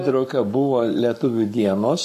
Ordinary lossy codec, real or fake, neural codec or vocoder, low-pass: MP3, 64 kbps; fake; codec, 44.1 kHz, 7.8 kbps, Pupu-Codec; 14.4 kHz